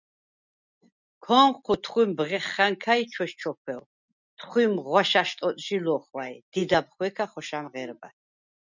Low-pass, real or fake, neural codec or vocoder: 7.2 kHz; real; none